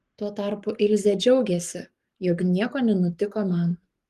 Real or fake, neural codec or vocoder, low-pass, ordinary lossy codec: fake; codec, 44.1 kHz, 7.8 kbps, Pupu-Codec; 14.4 kHz; Opus, 24 kbps